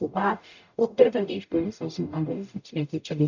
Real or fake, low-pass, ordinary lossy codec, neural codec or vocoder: fake; 7.2 kHz; none; codec, 44.1 kHz, 0.9 kbps, DAC